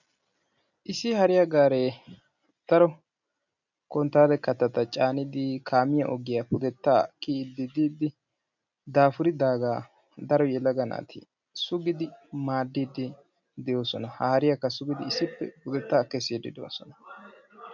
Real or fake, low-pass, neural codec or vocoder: real; 7.2 kHz; none